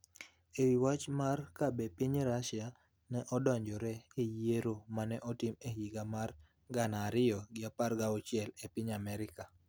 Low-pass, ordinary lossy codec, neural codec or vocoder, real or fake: none; none; none; real